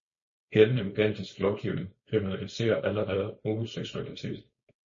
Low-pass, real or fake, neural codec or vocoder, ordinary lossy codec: 7.2 kHz; fake; codec, 16 kHz, 4.8 kbps, FACodec; MP3, 32 kbps